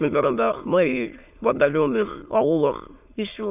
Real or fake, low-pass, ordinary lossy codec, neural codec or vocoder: fake; 3.6 kHz; none; autoencoder, 22.05 kHz, a latent of 192 numbers a frame, VITS, trained on many speakers